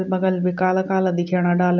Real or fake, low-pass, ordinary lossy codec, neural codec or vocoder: real; 7.2 kHz; none; none